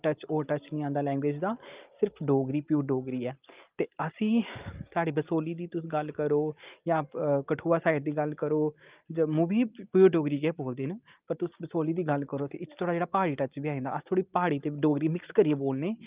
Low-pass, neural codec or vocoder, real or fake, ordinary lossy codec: 3.6 kHz; none; real; Opus, 32 kbps